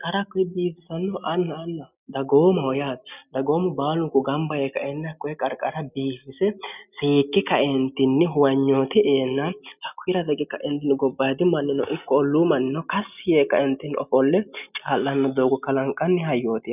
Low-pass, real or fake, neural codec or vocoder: 3.6 kHz; real; none